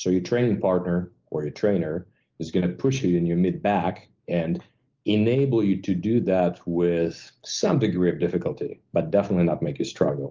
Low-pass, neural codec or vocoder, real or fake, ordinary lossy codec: 7.2 kHz; none; real; Opus, 32 kbps